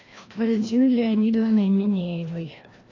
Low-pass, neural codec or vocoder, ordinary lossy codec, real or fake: 7.2 kHz; codec, 16 kHz, 1 kbps, FreqCodec, larger model; AAC, 32 kbps; fake